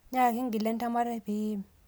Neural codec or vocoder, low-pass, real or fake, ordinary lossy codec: none; none; real; none